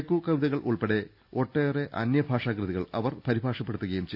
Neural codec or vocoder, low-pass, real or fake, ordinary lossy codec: none; 5.4 kHz; real; none